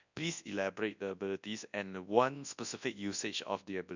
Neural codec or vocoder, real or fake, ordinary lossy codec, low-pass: codec, 24 kHz, 0.9 kbps, WavTokenizer, large speech release; fake; none; 7.2 kHz